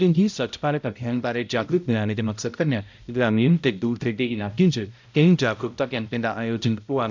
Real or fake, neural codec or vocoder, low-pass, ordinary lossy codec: fake; codec, 16 kHz, 0.5 kbps, X-Codec, HuBERT features, trained on balanced general audio; 7.2 kHz; MP3, 64 kbps